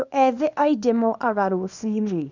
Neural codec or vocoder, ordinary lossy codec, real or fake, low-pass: codec, 24 kHz, 0.9 kbps, WavTokenizer, small release; none; fake; 7.2 kHz